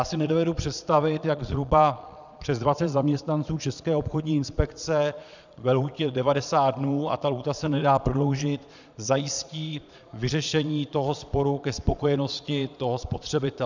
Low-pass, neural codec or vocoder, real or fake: 7.2 kHz; vocoder, 22.05 kHz, 80 mel bands, Vocos; fake